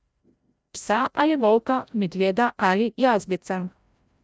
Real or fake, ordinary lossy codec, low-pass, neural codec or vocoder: fake; none; none; codec, 16 kHz, 0.5 kbps, FreqCodec, larger model